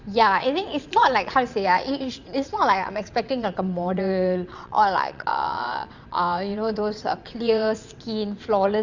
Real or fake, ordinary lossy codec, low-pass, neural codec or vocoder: fake; Opus, 64 kbps; 7.2 kHz; vocoder, 22.05 kHz, 80 mel bands, WaveNeXt